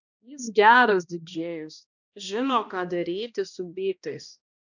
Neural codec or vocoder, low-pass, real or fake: codec, 16 kHz, 1 kbps, X-Codec, HuBERT features, trained on balanced general audio; 7.2 kHz; fake